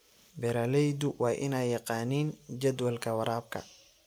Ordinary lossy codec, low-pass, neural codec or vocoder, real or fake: none; none; none; real